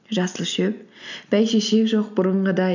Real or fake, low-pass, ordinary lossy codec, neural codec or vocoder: real; 7.2 kHz; none; none